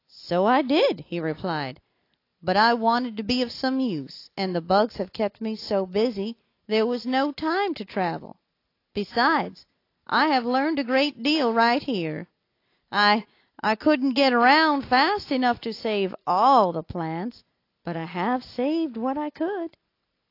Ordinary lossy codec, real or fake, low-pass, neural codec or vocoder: AAC, 32 kbps; real; 5.4 kHz; none